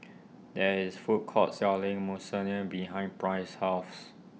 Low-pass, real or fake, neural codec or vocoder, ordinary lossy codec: none; real; none; none